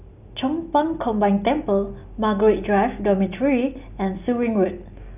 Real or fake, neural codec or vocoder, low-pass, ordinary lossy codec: real; none; 3.6 kHz; none